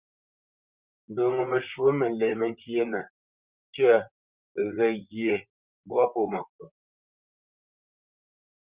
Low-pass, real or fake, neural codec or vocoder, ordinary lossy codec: 3.6 kHz; fake; vocoder, 44.1 kHz, 128 mel bands, Pupu-Vocoder; Opus, 64 kbps